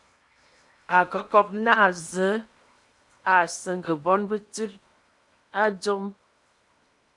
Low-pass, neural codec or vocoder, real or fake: 10.8 kHz; codec, 16 kHz in and 24 kHz out, 0.8 kbps, FocalCodec, streaming, 65536 codes; fake